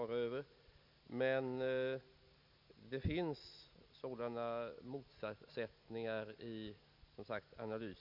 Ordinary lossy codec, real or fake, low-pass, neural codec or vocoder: MP3, 48 kbps; real; 5.4 kHz; none